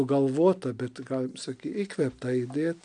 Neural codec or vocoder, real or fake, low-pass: none; real; 9.9 kHz